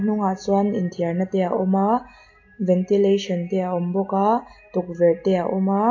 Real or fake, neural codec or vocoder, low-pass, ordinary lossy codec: real; none; 7.2 kHz; AAC, 48 kbps